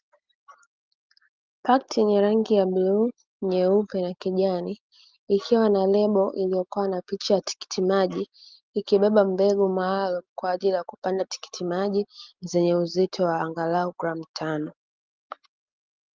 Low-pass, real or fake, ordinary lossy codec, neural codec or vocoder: 7.2 kHz; real; Opus, 32 kbps; none